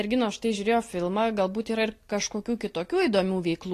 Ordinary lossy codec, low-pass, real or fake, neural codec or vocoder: AAC, 48 kbps; 14.4 kHz; real; none